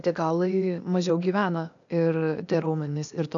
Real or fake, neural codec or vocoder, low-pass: fake; codec, 16 kHz, 0.8 kbps, ZipCodec; 7.2 kHz